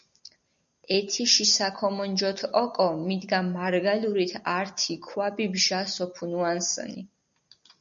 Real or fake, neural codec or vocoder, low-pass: real; none; 7.2 kHz